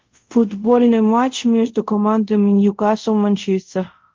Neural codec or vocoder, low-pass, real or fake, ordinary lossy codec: codec, 24 kHz, 0.5 kbps, DualCodec; 7.2 kHz; fake; Opus, 32 kbps